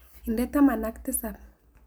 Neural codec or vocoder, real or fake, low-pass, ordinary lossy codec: none; real; none; none